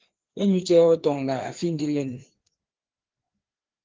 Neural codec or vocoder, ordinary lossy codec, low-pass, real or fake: codec, 16 kHz, 2 kbps, FreqCodec, larger model; Opus, 16 kbps; 7.2 kHz; fake